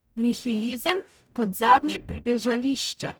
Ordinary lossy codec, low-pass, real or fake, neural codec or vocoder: none; none; fake; codec, 44.1 kHz, 0.9 kbps, DAC